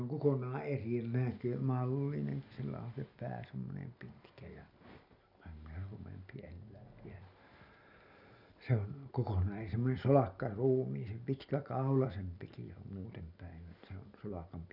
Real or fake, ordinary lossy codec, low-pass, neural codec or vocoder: real; none; 5.4 kHz; none